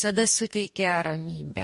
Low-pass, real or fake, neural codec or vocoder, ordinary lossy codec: 14.4 kHz; fake; codec, 44.1 kHz, 2.6 kbps, DAC; MP3, 48 kbps